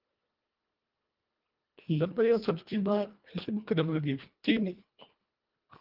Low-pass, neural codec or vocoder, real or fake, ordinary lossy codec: 5.4 kHz; codec, 24 kHz, 1.5 kbps, HILCodec; fake; Opus, 32 kbps